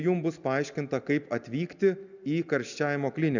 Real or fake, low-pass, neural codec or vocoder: real; 7.2 kHz; none